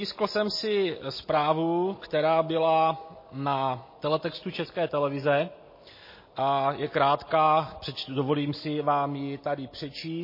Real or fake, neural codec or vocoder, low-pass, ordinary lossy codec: real; none; 5.4 kHz; MP3, 24 kbps